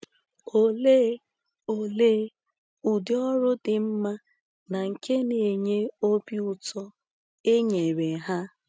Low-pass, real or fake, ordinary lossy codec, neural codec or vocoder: none; real; none; none